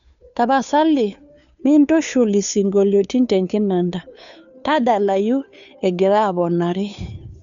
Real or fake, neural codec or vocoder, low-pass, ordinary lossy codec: fake; codec, 16 kHz, 2 kbps, FunCodec, trained on Chinese and English, 25 frames a second; 7.2 kHz; none